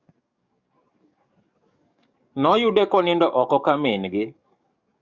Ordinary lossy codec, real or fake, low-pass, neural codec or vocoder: Opus, 64 kbps; fake; 7.2 kHz; codec, 44.1 kHz, 7.8 kbps, DAC